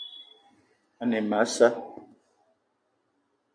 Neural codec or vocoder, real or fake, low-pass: none; real; 9.9 kHz